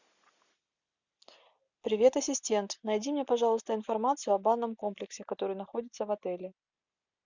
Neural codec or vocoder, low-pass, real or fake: none; 7.2 kHz; real